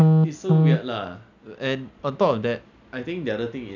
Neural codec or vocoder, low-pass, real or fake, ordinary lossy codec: none; 7.2 kHz; real; none